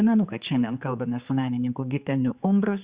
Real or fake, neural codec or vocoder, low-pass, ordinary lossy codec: fake; codec, 16 kHz, 4 kbps, X-Codec, HuBERT features, trained on general audio; 3.6 kHz; Opus, 64 kbps